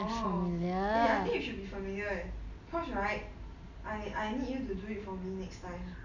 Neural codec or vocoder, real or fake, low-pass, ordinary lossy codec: none; real; 7.2 kHz; none